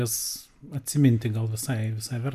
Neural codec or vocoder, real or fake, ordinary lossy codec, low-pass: none; real; MP3, 96 kbps; 14.4 kHz